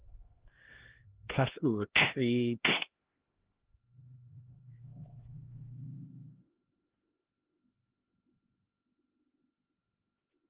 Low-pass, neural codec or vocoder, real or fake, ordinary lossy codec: 3.6 kHz; codec, 16 kHz, 1 kbps, X-Codec, HuBERT features, trained on LibriSpeech; fake; Opus, 16 kbps